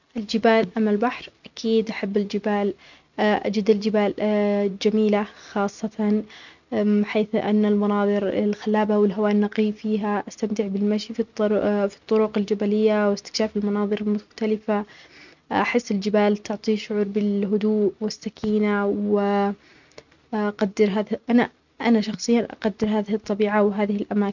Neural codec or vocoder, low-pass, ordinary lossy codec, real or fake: none; 7.2 kHz; none; real